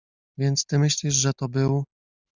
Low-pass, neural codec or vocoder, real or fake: 7.2 kHz; none; real